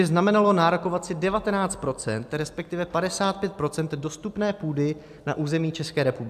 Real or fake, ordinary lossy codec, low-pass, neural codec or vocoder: real; AAC, 96 kbps; 14.4 kHz; none